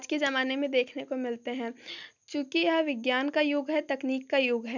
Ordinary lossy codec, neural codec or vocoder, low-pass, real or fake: none; none; 7.2 kHz; real